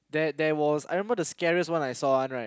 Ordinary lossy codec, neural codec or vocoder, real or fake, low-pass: none; none; real; none